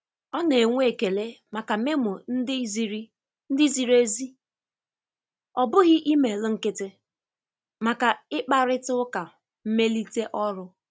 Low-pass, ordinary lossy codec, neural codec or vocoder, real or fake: none; none; none; real